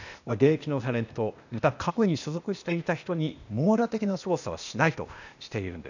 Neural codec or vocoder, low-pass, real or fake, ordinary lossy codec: codec, 16 kHz, 0.8 kbps, ZipCodec; 7.2 kHz; fake; none